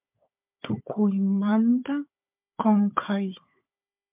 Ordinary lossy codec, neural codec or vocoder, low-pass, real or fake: MP3, 32 kbps; codec, 16 kHz, 4 kbps, FunCodec, trained on Chinese and English, 50 frames a second; 3.6 kHz; fake